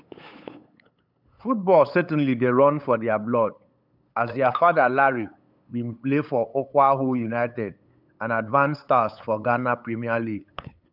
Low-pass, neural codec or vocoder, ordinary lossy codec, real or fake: 5.4 kHz; codec, 16 kHz, 8 kbps, FunCodec, trained on LibriTTS, 25 frames a second; none; fake